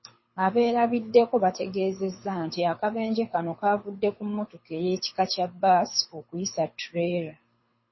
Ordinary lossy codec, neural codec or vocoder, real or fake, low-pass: MP3, 24 kbps; codec, 24 kHz, 6 kbps, HILCodec; fake; 7.2 kHz